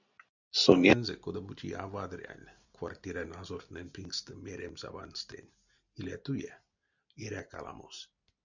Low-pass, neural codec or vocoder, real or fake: 7.2 kHz; none; real